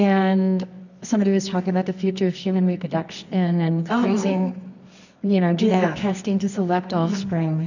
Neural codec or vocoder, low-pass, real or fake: codec, 24 kHz, 0.9 kbps, WavTokenizer, medium music audio release; 7.2 kHz; fake